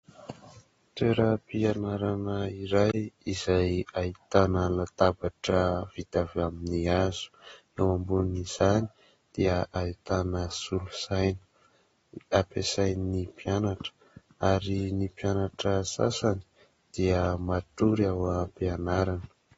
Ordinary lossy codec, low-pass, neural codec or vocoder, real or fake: AAC, 24 kbps; 10.8 kHz; none; real